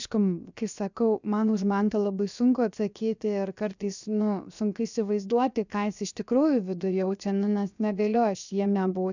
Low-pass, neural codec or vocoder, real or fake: 7.2 kHz; codec, 16 kHz, 0.7 kbps, FocalCodec; fake